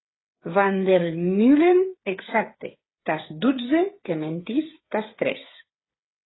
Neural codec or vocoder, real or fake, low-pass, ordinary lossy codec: codec, 16 kHz, 8 kbps, FreqCodec, smaller model; fake; 7.2 kHz; AAC, 16 kbps